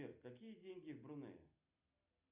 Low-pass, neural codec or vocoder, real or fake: 3.6 kHz; none; real